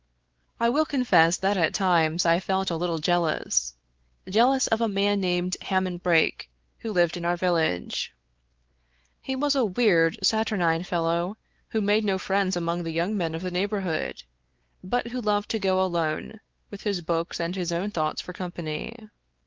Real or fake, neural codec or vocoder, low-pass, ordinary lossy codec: fake; codec, 16 kHz, 4 kbps, X-Codec, WavLM features, trained on Multilingual LibriSpeech; 7.2 kHz; Opus, 16 kbps